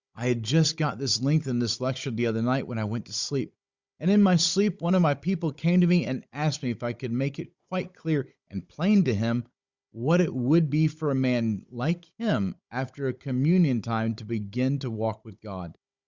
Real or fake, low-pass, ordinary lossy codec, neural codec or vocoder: fake; 7.2 kHz; Opus, 64 kbps; codec, 16 kHz, 16 kbps, FunCodec, trained on Chinese and English, 50 frames a second